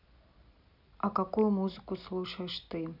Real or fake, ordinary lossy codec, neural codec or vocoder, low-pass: real; none; none; 5.4 kHz